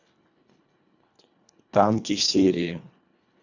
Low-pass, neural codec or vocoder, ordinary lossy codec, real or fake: 7.2 kHz; codec, 24 kHz, 1.5 kbps, HILCodec; none; fake